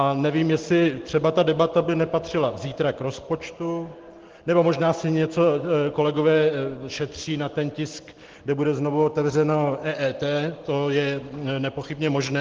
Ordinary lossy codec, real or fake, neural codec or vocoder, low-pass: Opus, 16 kbps; real; none; 7.2 kHz